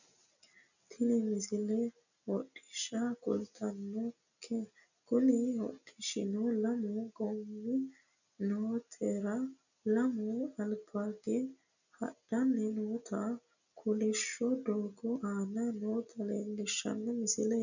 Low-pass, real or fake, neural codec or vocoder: 7.2 kHz; real; none